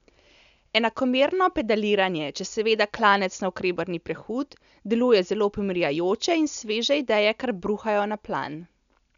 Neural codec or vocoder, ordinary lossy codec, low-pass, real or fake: none; none; 7.2 kHz; real